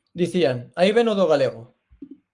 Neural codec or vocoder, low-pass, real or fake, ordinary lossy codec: codec, 44.1 kHz, 7.8 kbps, Pupu-Codec; 10.8 kHz; fake; Opus, 32 kbps